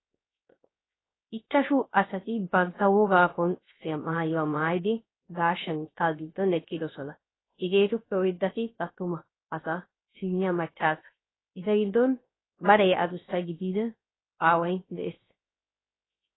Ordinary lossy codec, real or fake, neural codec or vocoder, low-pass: AAC, 16 kbps; fake; codec, 16 kHz, 0.3 kbps, FocalCodec; 7.2 kHz